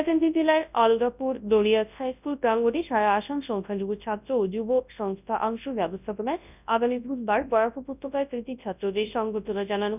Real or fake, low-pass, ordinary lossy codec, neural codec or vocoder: fake; 3.6 kHz; none; codec, 24 kHz, 0.9 kbps, WavTokenizer, large speech release